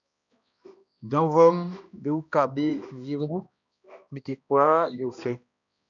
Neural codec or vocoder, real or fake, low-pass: codec, 16 kHz, 1 kbps, X-Codec, HuBERT features, trained on balanced general audio; fake; 7.2 kHz